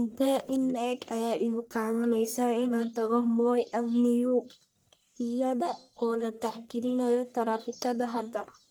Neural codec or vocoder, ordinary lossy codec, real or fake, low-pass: codec, 44.1 kHz, 1.7 kbps, Pupu-Codec; none; fake; none